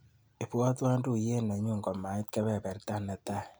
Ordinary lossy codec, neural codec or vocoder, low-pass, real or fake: none; none; none; real